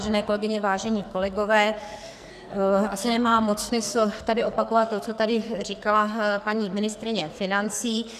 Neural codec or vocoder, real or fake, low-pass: codec, 44.1 kHz, 2.6 kbps, SNAC; fake; 14.4 kHz